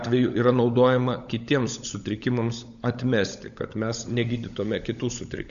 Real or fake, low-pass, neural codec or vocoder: fake; 7.2 kHz; codec, 16 kHz, 16 kbps, FunCodec, trained on LibriTTS, 50 frames a second